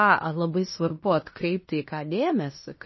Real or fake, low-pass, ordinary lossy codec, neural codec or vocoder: fake; 7.2 kHz; MP3, 24 kbps; codec, 16 kHz in and 24 kHz out, 0.9 kbps, LongCat-Audio-Codec, fine tuned four codebook decoder